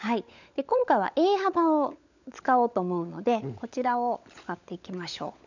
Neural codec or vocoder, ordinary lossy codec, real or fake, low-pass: codec, 16 kHz, 16 kbps, FunCodec, trained on LibriTTS, 50 frames a second; none; fake; 7.2 kHz